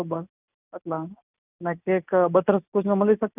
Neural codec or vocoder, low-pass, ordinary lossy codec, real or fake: none; 3.6 kHz; none; real